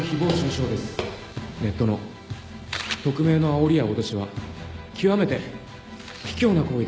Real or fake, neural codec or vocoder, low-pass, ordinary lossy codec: real; none; none; none